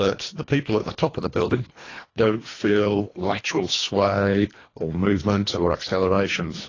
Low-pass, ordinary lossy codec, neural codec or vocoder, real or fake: 7.2 kHz; AAC, 32 kbps; codec, 24 kHz, 1.5 kbps, HILCodec; fake